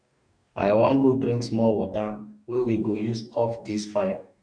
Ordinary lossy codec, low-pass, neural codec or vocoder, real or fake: none; 9.9 kHz; codec, 44.1 kHz, 2.6 kbps, DAC; fake